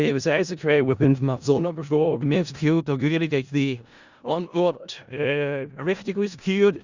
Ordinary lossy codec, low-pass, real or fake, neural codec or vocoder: Opus, 64 kbps; 7.2 kHz; fake; codec, 16 kHz in and 24 kHz out, 0.4 kbps, LongCat-Audio-Codec, four codebook decoder